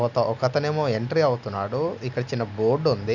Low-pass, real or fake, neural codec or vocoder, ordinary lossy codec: 7.2 kHz; real; none; none